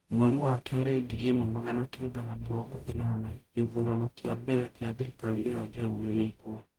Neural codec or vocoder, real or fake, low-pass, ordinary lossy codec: codec, 44.1 kHz, 0.9 kbps, DAC; fake; 19.8 kHz; Opus, 32 kbps